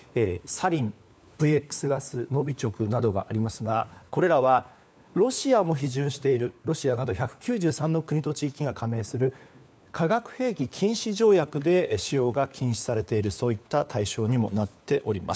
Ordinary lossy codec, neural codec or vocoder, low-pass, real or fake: none; codec, 16 kHz, 4 kbps, FunCodec, trained on LibriTTS, 50 frames a second; none; fake